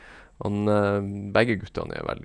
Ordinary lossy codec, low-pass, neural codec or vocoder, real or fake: none; 9.9 kHz; vocoder, 48 kHz, 128 mel bands, Vocos; fake